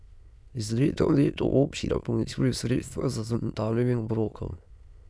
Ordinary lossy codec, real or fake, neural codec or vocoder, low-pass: none; fake; autoencoder, 22.05 kHz, a latent of 192 numbers a frame, VITS, trained on many speakers; none